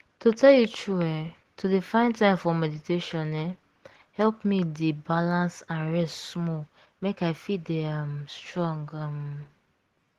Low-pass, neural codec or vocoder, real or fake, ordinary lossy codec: 14.4 kHz; none; real; Opus, 16 kbps